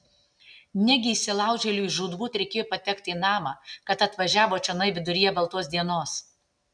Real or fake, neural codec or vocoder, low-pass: real; none; 9.9 kHz